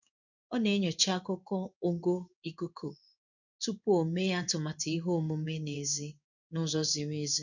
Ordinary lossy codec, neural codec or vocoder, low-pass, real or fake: none; codec, 16 kHz in and 24 kHz out, 1 kbps, XY-Tokenizer; 7.2 kHz; fake